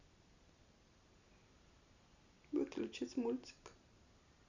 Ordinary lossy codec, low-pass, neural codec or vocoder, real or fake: Opus, 64 kbps; 7.2 kHz; none; real